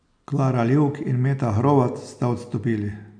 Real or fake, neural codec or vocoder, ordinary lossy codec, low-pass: real; none; none; 9.9 kHz